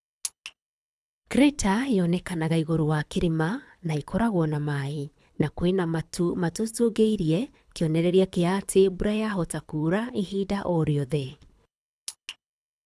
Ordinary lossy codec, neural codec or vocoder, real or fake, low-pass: none; codec, 24 kHz, 6 kbps, HILCodec; fake; none